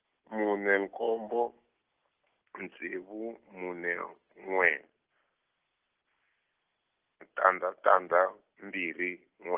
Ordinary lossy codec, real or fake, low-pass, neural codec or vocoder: Opus, 16 kbps; real; 3.6 kHz; none